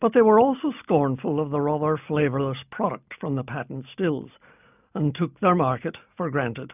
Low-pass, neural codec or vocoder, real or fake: 3.6 kHz; none; real